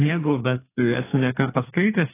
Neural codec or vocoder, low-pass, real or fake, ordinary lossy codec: codec, 32 kHz, 1.9 kbps, SNAC; 3.6 kHz; fake; AAC, 16 kbps